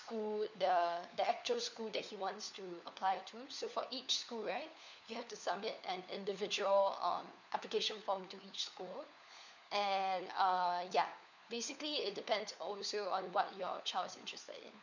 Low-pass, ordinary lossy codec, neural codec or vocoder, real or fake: 7.2 kHz; none; codec, 16 kHz, 4 kbps, FunCodec, trained on LibriTTS, 50 frames a second; fake